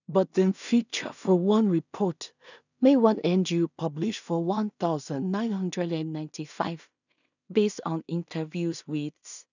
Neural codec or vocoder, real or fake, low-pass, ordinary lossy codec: codec, 16 kHz in and 24 kHz out, 0.4 kbps, LongCat-Audio-Codec, two codebook decoder; fake; 7.2 kHz; none